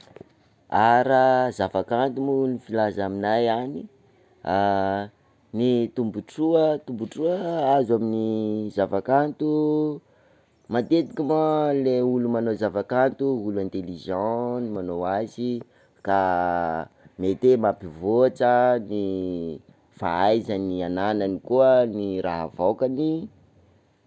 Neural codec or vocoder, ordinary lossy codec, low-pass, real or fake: none; none; none; real